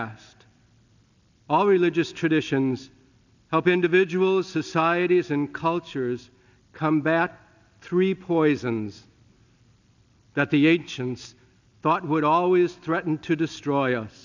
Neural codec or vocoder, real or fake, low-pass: none; real; 7.2 kHz